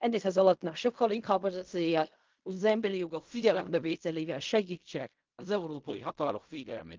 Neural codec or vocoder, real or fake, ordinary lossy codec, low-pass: codec, 16 kHz in and 24 kHz out, 0.4 kbps, LongCat-Audio-Codec, fine tuned four codebook decoder; fake; Opus, 32 kbps; 7.2 kHz